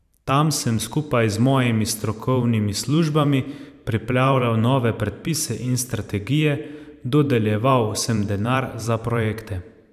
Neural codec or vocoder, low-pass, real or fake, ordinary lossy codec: vocoder, 44.1 kHz, 128 mel bands every 512 samples, BigVGAN v2; 14.4 kHz; fake; none